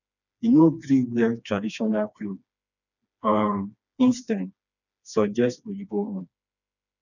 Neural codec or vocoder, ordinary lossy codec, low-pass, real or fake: codec, 16 kHz, 2 kbps, FreqCodec, smaller model; none; 7.2 kHz; fake